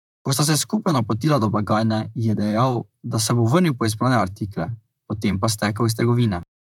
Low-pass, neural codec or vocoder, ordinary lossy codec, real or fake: 19.8 kHz; vocoder, 44.1 kHz, 128 mel bands, Pupu-Vocoder; none; fake